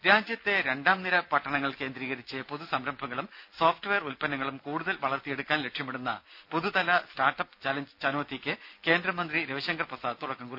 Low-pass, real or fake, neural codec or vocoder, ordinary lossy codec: 5.4 kHz; real; none; none